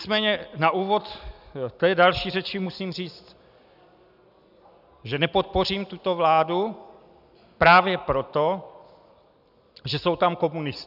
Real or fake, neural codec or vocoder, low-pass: real; none; 5.4 kHz